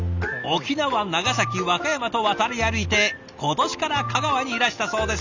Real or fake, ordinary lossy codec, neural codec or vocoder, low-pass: real; none; none; 7.2 kHz